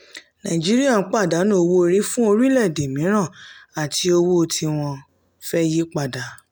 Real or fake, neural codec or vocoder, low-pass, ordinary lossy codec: real; none; none; none